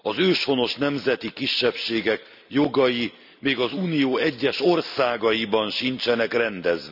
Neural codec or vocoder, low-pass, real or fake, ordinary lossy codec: none; 5.4 kHz; real; none